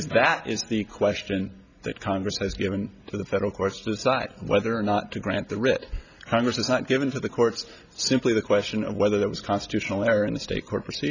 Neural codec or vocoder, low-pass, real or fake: none; 7.2 kHz; real